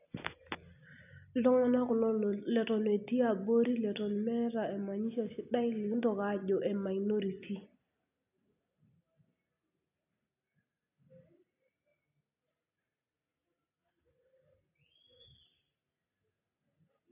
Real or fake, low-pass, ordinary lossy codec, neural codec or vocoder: real; 3.6 kHz; none; none